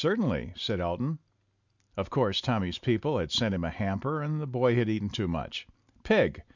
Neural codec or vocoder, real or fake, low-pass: none; real; 7.2 kHz